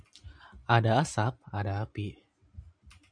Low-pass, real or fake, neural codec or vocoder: 9.9 kHz; fake; vocoder, 44.1 kHz, 128 mel bands every 512 samples, BigVGAN v2